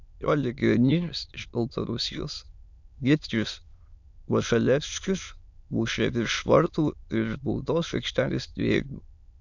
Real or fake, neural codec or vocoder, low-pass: fake; autoencoder, 22.05 kHz, a latent of 192 numbers a frame, VITS, trained on many speakers; 7.2 kHz